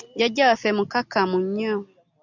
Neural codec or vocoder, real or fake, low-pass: none; real; 7.2 kHz